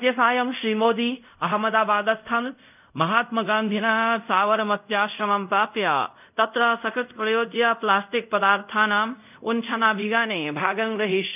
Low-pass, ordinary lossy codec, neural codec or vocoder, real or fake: 3.6 kHz; none; codec, 24 kHz, 0.5 kbps, DualCodec; fake